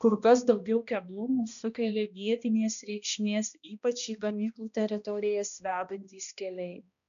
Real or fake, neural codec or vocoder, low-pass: fake; codec, 16 kHz, 1 kbps, X-Codec, HuBERT features, trained on balanced general audio; 7.2 kHz